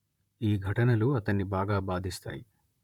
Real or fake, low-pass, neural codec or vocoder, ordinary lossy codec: fake; 19.8 kHz; vocoder, 44.1 kHz, 128 mel bands, Pupu-Vocoder; none